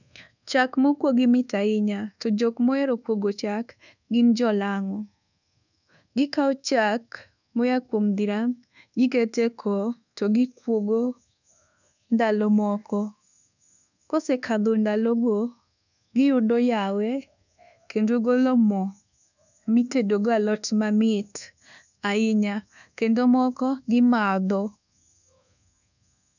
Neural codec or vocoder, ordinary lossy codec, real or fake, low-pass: codec, 24 kHz, 1.2 kbps, DualCodec; none; fake; 7.2 kHz